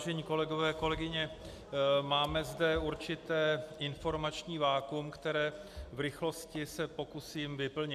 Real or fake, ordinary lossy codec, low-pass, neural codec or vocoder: real; MP3, 96 kbps; 14.4 kHz; none